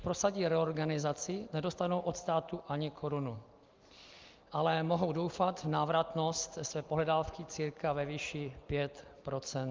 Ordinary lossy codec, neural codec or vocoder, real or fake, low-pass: Opus, 32 kbps; none; real; 7.2 kHz